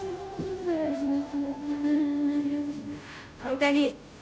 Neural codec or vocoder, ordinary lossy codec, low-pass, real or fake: codec, 16 kHz, 0.5 kbps, FunCodec, trained on Chinese and English, 25 frames a second; none; none; fake